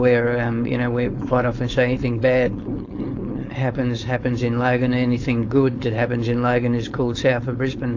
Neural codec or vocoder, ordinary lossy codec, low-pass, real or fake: codec, 16 kHz, 4.8 kbps, FACodec; AAC, 48 kbps; 7.2 kHz; fake